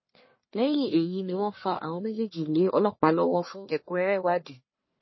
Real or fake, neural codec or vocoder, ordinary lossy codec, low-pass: fake; codec, 44.1 kHz, 1.7 kbps, Pupu-Codec; MP3, 24 kbps; 7.2 kHz